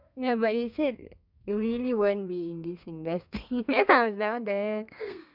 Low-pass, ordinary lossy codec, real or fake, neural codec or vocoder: 5.4 kHz; none; fake; codec, 32 kHz, 1.9 kbps, SNAC